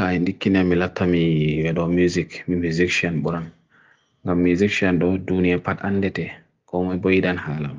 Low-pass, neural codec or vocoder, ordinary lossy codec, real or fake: 7.2 kHz; none; Opus, 32 kbps; real